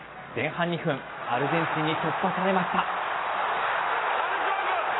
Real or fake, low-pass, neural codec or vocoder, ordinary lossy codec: real; 7.2 kHz; none; AAC, 16 kbps